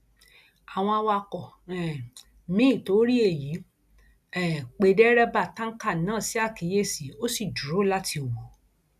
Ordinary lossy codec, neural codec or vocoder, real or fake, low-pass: none; none; real; 14.4 kHz